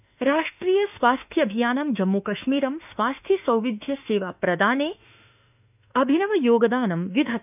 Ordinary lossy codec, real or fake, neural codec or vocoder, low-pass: none; fake; autoencoder, 48 kHz, 32 numbers a frame, DAC-VAE, trained on Japanese speech; 3.6 kHz